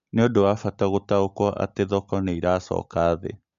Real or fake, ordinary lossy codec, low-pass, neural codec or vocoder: real; MP3, 64 kbps; 7.2 kHz; none